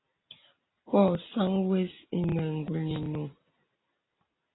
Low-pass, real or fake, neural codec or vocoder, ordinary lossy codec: 7.2 kHz; real; none; AAC, 16 kbps